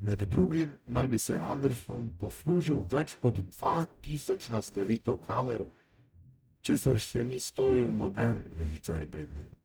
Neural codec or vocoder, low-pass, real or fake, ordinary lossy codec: codec, 44.1 kHz, 0.9 kbps, DAC; none; fake; none